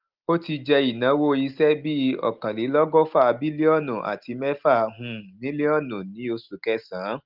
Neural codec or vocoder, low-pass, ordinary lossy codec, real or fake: none; 5.4 kHz; Opus, 32 kbps; real